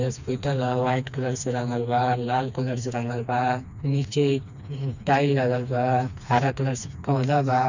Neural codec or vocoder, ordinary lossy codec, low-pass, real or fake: codec, 16 kHz, 2 kbps, FreqCodec, smaller model; none; 7.2 kHz; fake